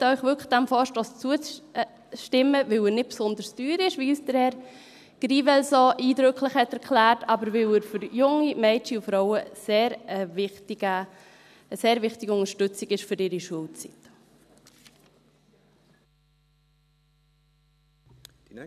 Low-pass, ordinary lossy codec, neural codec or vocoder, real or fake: 14.4 kHz; none; none; real